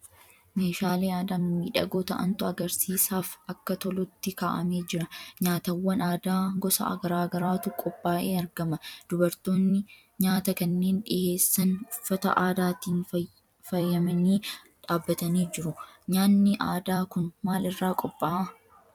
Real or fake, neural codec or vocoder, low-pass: fake; vocoder, 44.1 kHz, 128 mel bands every 256 samples, BigVGAN v2; 19.8 kHz